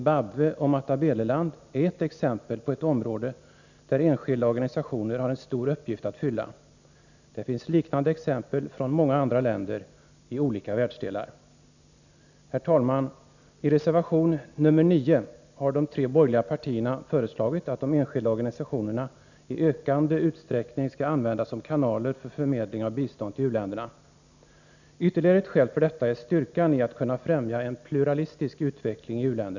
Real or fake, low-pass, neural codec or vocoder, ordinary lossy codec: real; 7.2 kHz; none; none